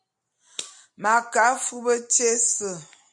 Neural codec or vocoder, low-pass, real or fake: none; 10.8 kHz; real